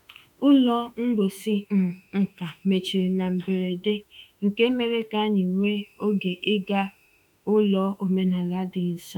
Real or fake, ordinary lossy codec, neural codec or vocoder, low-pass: fake; none; autoencoder, 48 kHz, 32 numbers a frame, DAC-VAE, trained on Japanese speech; 19.8 kHz